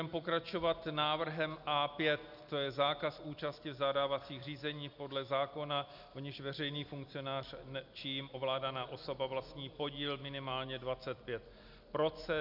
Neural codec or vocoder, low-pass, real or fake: none; 5.4 kHz; real